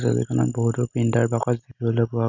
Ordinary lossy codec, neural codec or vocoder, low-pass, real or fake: none; none; 7.2 kHz; real